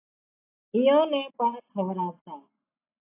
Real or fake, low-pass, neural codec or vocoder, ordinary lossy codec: real; 3.6 kHz; none; AAC, 24 kbps